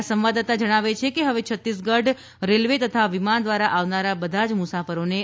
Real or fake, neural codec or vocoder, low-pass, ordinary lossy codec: real; none; none; none